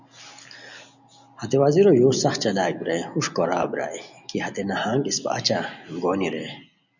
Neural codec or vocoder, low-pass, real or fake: none; 7.2 kHz; real